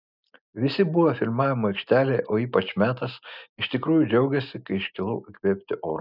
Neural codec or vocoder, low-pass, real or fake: none; 5.4 kHz; real